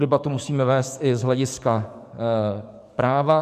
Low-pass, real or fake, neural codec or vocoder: 14.4 kHz; fake; codec, 44.1 kHz, 7.8 kbps, DAC